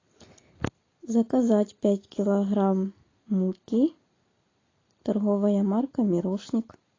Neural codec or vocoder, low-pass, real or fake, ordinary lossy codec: none; 7.2 kHz; real; AAC, 32 kbps